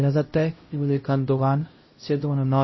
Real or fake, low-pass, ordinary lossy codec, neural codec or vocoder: fake; 7.2 kHz; MP3, 24 kbps; codec, 16 kHz, 0.5 kbps, X-Codec, HuBERT features, trained on LibriSpeech